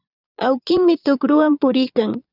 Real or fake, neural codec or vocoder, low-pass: fake; vocoder, 22.05 kHz, 80 mel bands, Vocos; 5.4 kHz